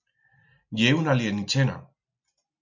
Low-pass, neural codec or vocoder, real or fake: 7.2 kHz; none; real